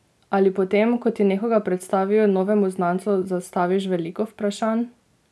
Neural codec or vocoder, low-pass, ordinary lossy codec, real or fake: none; none; none; real